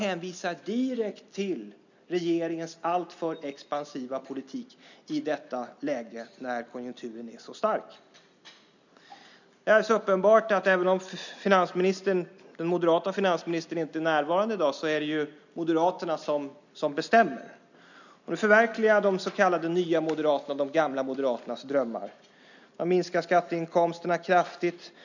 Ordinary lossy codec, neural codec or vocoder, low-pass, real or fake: none; none; 7.2 kHz; real